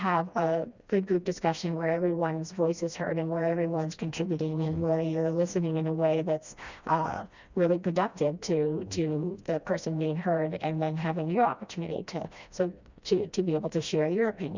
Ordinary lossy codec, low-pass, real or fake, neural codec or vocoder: Opus, 64 kbps; 7.2 kHz; fake; codec, 16 kHz, 1 kbps, FreqCodec, smaller model